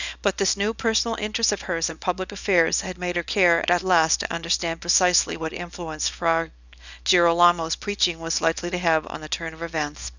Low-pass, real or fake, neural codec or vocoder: 7.2 kHz; fake; codec, 24 kHz, 0.9 kbps, WavTokenizer, small release